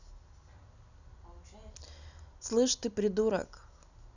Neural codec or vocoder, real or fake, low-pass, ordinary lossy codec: none; real; 7.2 kHz; none